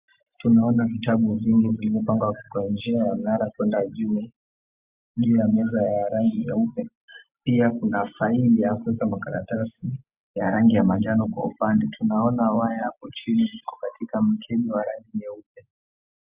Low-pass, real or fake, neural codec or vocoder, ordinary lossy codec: 3.6 kHz; real; none; Opus, 64 kbps